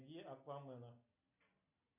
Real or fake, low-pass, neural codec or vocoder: real; 3.6 kHz; none